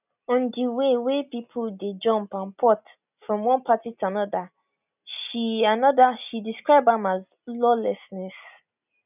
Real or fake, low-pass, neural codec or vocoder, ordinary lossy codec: real; 3.6 kHz; none; none